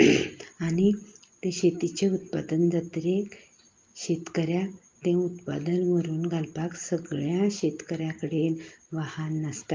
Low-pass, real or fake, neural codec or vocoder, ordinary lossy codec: 7.2 kHz; real; none; Opus, 32 kbps